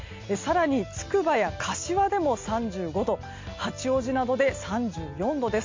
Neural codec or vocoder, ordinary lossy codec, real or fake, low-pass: none; MP3, 48 kbps; real; 7.2 kHz